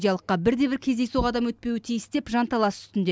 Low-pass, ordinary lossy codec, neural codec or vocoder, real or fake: none; none; none; real